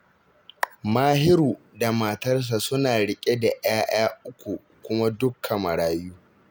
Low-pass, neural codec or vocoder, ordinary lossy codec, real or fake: none; none; none; real